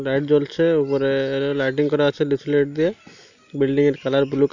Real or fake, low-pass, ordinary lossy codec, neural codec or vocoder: real; 7.2 kHz; none; none